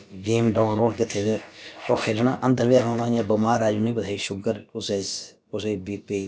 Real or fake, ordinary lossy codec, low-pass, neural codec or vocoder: fake; none; none; codec, 16 kHz, about 1 kbps, DyCAST, with the encoder's durations